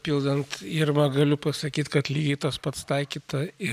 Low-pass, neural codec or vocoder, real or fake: 14.4 kHz; none; real